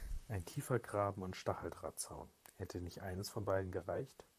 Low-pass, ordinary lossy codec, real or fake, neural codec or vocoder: 14.4 kHz; AAC, 96 kbps; fake; vocoder, 44.1 kHz, 128 mel bands, Pupu-Vocoder